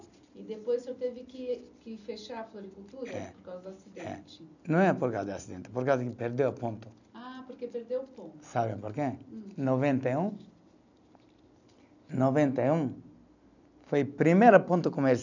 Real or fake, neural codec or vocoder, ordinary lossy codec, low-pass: real; none; none; 7.2 kHz